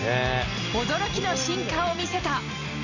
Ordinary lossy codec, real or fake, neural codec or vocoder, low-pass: none; real; none; 7.2 kHz